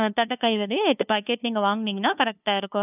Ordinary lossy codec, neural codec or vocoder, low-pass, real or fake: none; codec, 16 kHz, 2 kbps, FunCodec, trained on LibriTTS, 25 frames a second; 3.6 kHz; fake